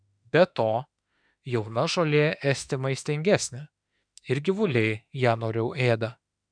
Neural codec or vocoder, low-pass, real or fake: autoencoder, 48 kHz, 32 numbers a frame, DAC-VAE, trained on Japanese speech; 9.9 kHz; fake